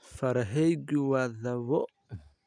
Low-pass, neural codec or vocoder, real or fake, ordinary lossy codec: 9.9 kHz; none; real; none